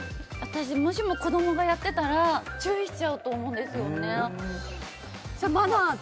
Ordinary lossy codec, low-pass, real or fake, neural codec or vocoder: none; none; real; none